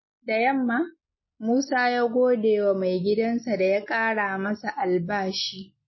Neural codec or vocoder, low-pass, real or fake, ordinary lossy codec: none; 7.2 kHz; real; MP3, 24 kbps